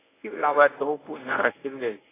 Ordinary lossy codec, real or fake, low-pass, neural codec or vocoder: AAC, 16 kbps; fake; 3.6 kHz; codec, 24 kHz, 0.9 kbps, WavTokenizer, medium speech release version 1